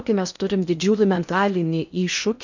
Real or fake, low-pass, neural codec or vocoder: fake; 7.2 kHz; codec, 16 kHz in and 24 kHz out, 0.6 kbps, FocalCodec, streaming, 2048 codes